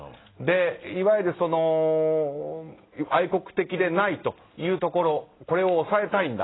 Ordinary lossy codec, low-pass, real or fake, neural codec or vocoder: AAC, 16 kbps; 7.2 kHz; real; none